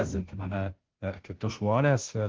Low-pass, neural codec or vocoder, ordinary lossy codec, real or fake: 7.2 kHz; codec, 16 kHz, 0.5 kbps, FunCodec, trained on Chinese and English, 25 frames a second; Opus, 16 kbps; fake